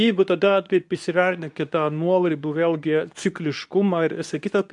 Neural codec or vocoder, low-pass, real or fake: codec, 24 kHz, 0.9 kbps, WavTokenizer, medium speech release version 2; 10.8 kHz; fake